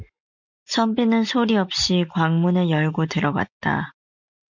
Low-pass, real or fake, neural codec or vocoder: 7.2 kHz; real; none